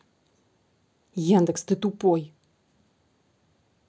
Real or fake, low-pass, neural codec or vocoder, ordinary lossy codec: real; none; none; none